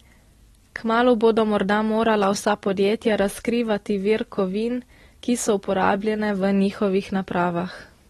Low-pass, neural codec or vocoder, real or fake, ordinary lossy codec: 19.8 kHz; none; real; AAC, 32 kbps